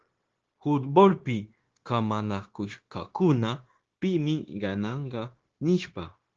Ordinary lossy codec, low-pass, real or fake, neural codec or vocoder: Opus, 16 kbps; 7.2 kHz; fake; codec, 16 kHz, 0.9 kbps, LongCat-Audio-Codec